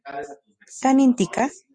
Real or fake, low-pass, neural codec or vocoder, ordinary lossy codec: real; 9.9 kHz; none; Opus, 64 kbps